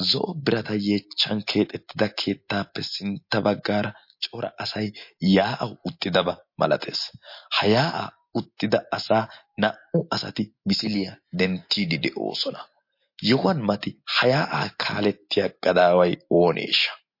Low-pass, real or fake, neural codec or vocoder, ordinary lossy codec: 5.4 kHz; real; none; MP3, 32 kbps